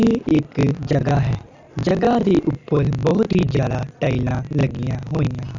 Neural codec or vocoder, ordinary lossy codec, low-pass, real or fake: none; none; 7.2 kHz; real